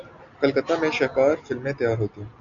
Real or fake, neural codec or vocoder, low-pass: real; none; 7.2 kHz